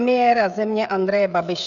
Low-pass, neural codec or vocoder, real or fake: 7.2 kHz; codec, 16 kHz, 16 kbps, FreqCodec, smaller model; fake